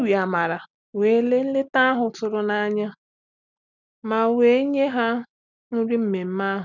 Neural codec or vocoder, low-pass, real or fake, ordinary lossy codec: none; 7.2 kHz; real; none